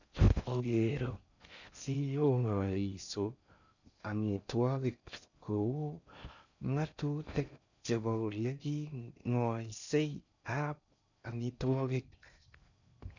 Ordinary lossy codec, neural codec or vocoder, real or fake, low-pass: none; codec, 16 kHz in and 24 kHz out, 0.6 kbps, FocalCodec, streaming, 4096 codes; fake; 7.2 kHz